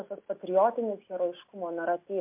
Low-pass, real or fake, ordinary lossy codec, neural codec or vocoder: 3.6 kHz; real; MP3, 32 kbps; none